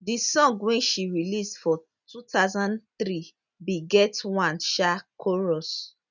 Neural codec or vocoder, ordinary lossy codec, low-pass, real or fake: none; none; 7.2 kHz; real